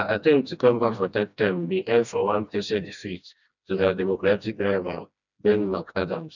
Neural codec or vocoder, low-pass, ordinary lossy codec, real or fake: codec, 16 kHz, 1 kbps, FreqCodec, smaller model; 7.2 kHz; none; fake